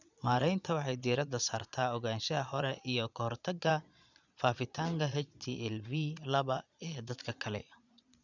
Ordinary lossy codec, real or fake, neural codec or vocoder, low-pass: none; fake; vocoder, 22.05 kHz, 80 mel bands, Vocos; 7.2 kHz